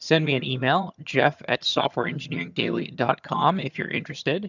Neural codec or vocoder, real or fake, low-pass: vocoder, 22.05 kHz, 80 mel bands, HiFi-GAN; fake; 7.2 kHz